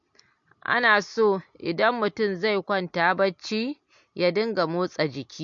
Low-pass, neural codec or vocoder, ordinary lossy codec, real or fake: 7.2 kHz; none; MP3, 48 kbps; real